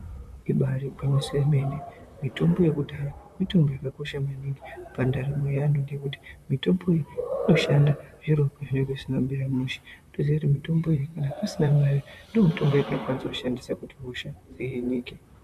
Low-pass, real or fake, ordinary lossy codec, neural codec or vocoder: 14.4 kHz; fake; MP3, 96 kbps; vocoder, 44.1 kHz, 128 mel bands, Pupu-Vocoder